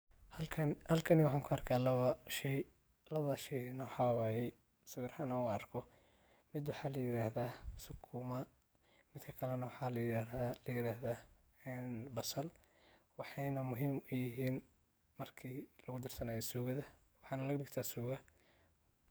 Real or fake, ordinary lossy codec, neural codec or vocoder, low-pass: fake; none; codec, 44.1 kHz, 7.8 kbps, DAC; none